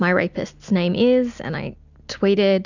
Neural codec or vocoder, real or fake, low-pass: none; real; 7.2 kHz